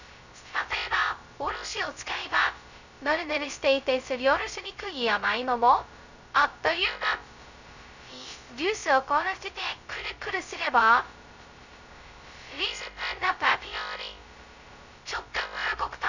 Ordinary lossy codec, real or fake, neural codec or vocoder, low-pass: none; fake; codec, 16 kHz, 0.2 kbps, FocalCodec; 7.2 kHz